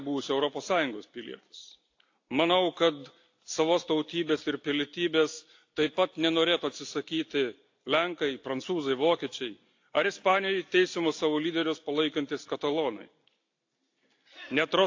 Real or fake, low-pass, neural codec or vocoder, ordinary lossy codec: real; 7.2 kHz; none; AAC, 48 kbps